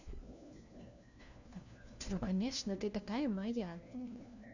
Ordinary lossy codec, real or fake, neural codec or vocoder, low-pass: none; fake; codec, 16 kHz, 1 kbps, FunCodec, trained on LibriTTS, 50 frames a second; 7.2 kHz